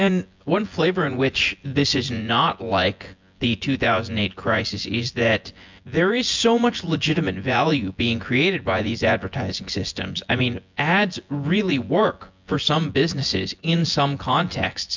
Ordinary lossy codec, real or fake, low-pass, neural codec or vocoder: MP3, 64 kbps; fake; 7.2 kHz; vocoder, 24 kHz, 100 mel bands, Vocos